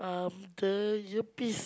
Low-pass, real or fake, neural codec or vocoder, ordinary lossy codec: none; real; none; none